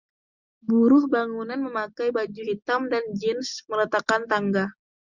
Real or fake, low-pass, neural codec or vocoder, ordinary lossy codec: real; 7.2 kHz; none; Opus, 64 kbps